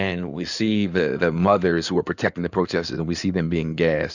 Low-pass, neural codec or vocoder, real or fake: 7.2 kHz; codec, 16 kHz in and 24 kHz out, 2.2 kbps, FireRedTTS-2 codec; fake